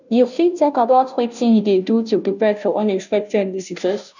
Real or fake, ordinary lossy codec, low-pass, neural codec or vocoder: fake; none; 7.2 kHz; codec, 16 kHz, 0.5 kbps, FunCodec, trained on Chinese and English, 25 frames a second